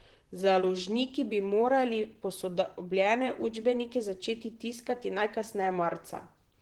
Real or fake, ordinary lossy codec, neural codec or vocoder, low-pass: fake; Opus, 16 kbps; vocoder, 44.1 kHz, 128 mel bands, Pupu-Vocoder; 19.8 kHz